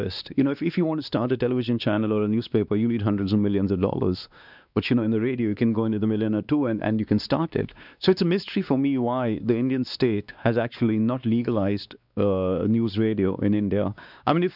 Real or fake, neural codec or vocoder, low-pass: fake; codec, 16 kHz, 2 kbps, X-Codec, WavLM features, trained on Multilingual LibriSpeech; 5.4 kHz